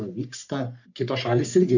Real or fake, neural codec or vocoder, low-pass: fake; codec, 44.1 kHz, 3.4 kbps, Pupu-Codec; 7.2 kHz